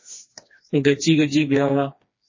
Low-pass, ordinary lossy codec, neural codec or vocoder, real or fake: 7.2 kHz; MP3, 32 kbps; codec, 16 kHz, 2 kbps, FreqCodec, smaller model; fake